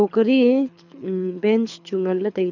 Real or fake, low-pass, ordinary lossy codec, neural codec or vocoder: fake; 7.2 kHz; none; codec, 24 kHz, 6 kbps, HILCodec